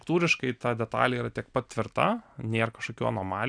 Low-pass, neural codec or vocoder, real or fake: 9.9 kHz; none; real